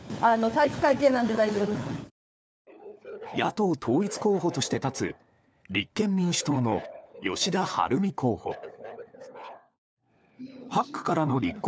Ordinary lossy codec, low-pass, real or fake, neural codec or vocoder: none; none; fake; codec, 16 kHz, 4 kbps, FunCodec, trained on LibriTTS, 50 frames a second